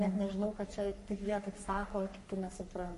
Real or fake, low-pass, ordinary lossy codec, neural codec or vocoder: fake; 14.4 kHz; MP3, 48 kbps; codec, 44.1 kHz, 3.4 kbps, Pupu-Codec